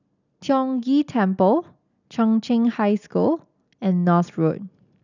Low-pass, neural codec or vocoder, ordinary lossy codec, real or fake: 7.2 kHz; none; none; real